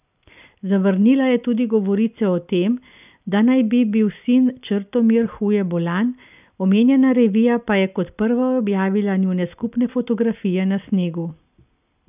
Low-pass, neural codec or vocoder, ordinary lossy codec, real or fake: 3.6 kHz; none; none; real